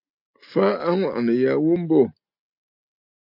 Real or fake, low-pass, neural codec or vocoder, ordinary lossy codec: real; 5.4 kHz; none; AAC, 48 kbps